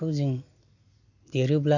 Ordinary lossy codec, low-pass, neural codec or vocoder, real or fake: none; 7.2 kHz; none; real